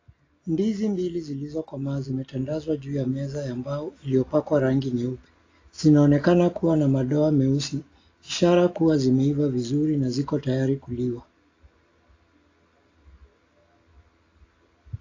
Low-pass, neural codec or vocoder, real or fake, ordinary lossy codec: 7.2 kHz; none; real; AAC, 32 kbps